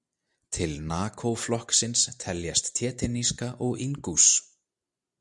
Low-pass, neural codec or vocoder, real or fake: 10.8 kHz; none; real